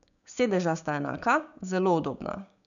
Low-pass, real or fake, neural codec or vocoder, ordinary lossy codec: 7.2 kHz; fake; codec, 16 kHz, 6 kbps, DAC; none